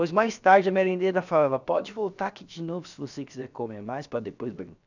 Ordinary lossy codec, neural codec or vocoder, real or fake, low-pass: none; codec, 16 kHz, about 1 kbps, DyCAST, with the encoder's durations; fake; 7.2 kHz